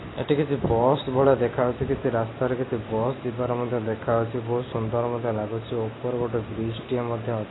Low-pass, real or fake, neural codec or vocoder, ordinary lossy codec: 7.2 kHz; real; none; AAC, 16 kbps